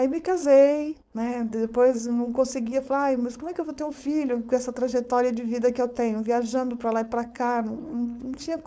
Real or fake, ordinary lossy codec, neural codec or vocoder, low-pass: fake; none; codec, 16 kHz, 4.8 kbps, FACodec; none